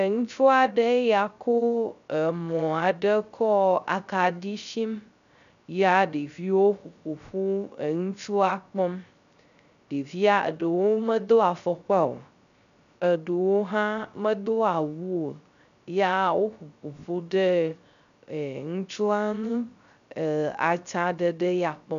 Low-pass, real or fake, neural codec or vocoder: 7.2 kHz; fake; codec, 16 kHz, 0.3 kbps, FocalCodec